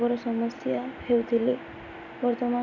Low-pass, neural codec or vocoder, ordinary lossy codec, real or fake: 7.2 kHz; none; none; real